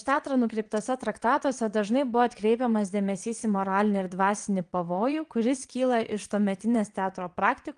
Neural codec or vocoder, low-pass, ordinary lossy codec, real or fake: vocoder, 22.05 kHz, 80 mel bands, WaveNeXt; 9.9 kHz; Opus, 24 kbps; fake